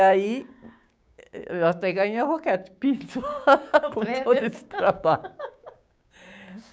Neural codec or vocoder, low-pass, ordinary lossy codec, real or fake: codec, 16 kHz, 6 kbps, DAC; none; none; fake